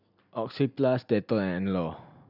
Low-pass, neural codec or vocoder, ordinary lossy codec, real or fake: 5.4 kHz; none; none; real